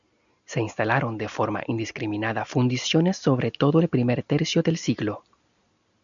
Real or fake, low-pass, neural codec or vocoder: real; 7.2 kHz; none